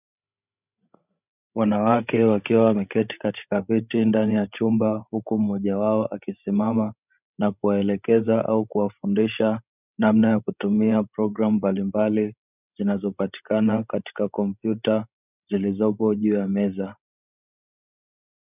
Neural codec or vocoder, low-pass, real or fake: codec, 16 kHz, 16 kbps, FreqCodec, larger model; 3.6 kHz; fake